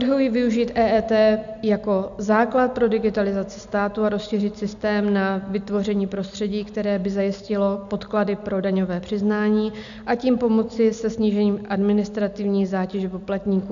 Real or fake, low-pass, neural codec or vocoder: real; 7.2 kHz; none